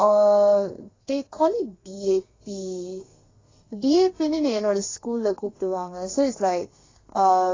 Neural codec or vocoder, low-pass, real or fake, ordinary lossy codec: codec, 32 kHz, 1.9 kbps, SNAC; 7.2 kHz; fake; AAC, 32 kbps